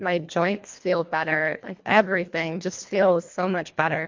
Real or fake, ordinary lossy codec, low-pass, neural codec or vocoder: fake; MP3, 48 kbps; 7.2 kHz; codec, 24 kHz, 1.5 kbps, HILCodec